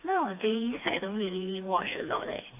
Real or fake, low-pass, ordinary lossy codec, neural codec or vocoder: fake; 3.6 kHz; MP3, 32 kbps; codec, 16 kHz, 2 kbps, FreqCodec, smaller model